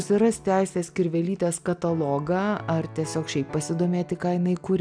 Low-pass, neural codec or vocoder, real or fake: 9.9 kHz; none; real